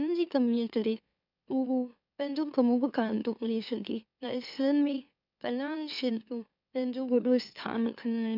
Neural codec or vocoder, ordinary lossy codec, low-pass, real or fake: autoencoder, 44.1 kHz, a latent of 192 numbers a frame, MeloTTS; none; 5.4 kHz; fake